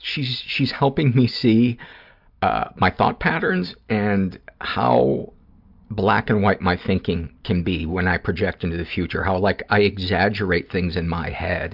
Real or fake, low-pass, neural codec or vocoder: real; 5.4 kHz; none